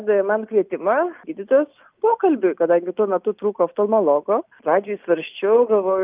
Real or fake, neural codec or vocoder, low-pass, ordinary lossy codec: real; none; 3.6 kHz; Opus, 24 kbps